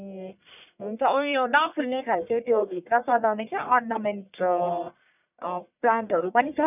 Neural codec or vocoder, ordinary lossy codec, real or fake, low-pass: codec, 44.1 kHz, 1.7 kbps, Pupu-Codec; none; fake; 3.6 kHz